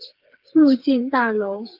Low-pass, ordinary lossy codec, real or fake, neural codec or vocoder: 5.4 kHz; Opus, 24 kbps; fake; codec, 16 kHz, 4 kbps, FreqCodec, smaller model